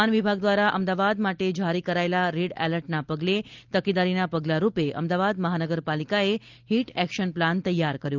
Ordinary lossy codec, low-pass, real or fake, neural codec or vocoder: Opus, 24 kbps; 7.2 kHz; real; none